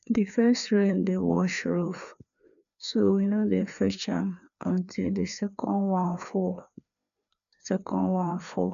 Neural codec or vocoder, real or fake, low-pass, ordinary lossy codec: codec, 16 kHz, 2 kbps, FreqCodec, larger model; fake; 7.2 kHz; none